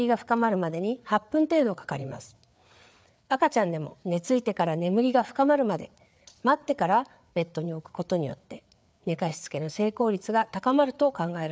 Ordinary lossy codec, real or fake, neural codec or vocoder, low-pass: none; fake; codec, 16 kHz, 4 kbps, FreqCodec, larger model; none